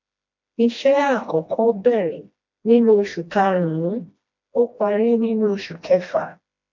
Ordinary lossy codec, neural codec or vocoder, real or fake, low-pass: MP3, 64 kbps; codec, 16 kHz, 1 kbps, FreqCodec, smaller model; fake; 7.2 kHz